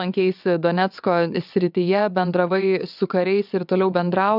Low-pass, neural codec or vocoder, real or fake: 5.4 kHz; vocoder, 22.05 kHz, 80 mel bands, WaveNeXt; fake